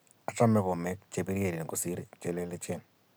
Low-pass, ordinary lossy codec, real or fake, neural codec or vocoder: none; none; real; none